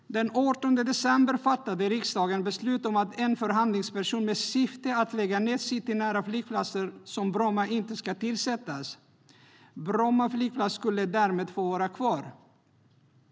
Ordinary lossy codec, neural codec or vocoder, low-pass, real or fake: none; none; none; real